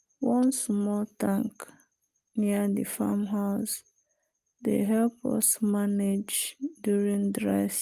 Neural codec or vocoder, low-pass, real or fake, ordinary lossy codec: none; 14.4 kHz; real; Opus, 32 kbps